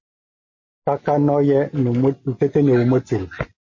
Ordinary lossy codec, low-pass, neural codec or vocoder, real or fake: MP3, 32 kbps; 7.2 kHz; none; real